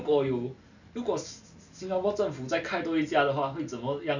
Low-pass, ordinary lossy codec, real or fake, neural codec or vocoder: 7.2 kHz; none; real; none